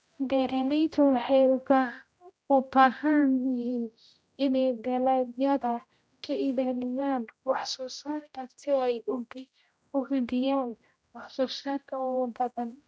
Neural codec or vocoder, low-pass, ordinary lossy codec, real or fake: codec, 16 kHz, 0.5 kbps, X-Codec, HuBERT features, trained on general audio; none; none; fake